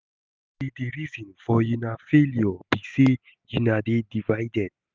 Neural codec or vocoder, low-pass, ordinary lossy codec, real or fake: none; none; none; real